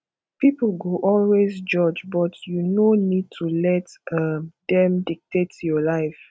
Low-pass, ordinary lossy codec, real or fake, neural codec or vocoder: none; none; real; none